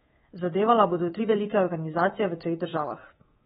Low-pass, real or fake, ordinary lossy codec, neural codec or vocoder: 10.8 kHz; fake; AAC, 16 kbps; codec, 24 kHz, 3.1 kbps, DualCodec